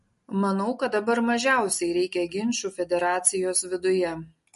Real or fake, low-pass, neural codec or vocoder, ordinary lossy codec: real; 14.4 kHz; none; MP3, 48 kbps